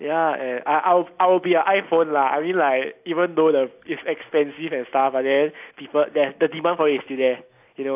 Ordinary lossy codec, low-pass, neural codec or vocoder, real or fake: AAC, 32 kbps; 3.6 kHz; none; real